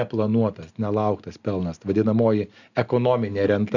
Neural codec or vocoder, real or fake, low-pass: none; real; 7.2 kHz